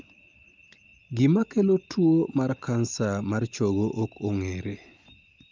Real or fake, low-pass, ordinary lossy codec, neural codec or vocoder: real; 7.2 kHz; Opus, 24 kbps; none